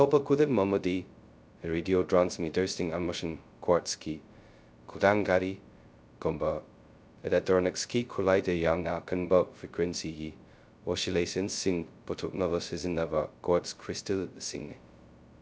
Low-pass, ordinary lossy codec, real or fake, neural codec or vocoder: none; none; fake; codec, 16 kHz, 0.2 kbps, FocalCodec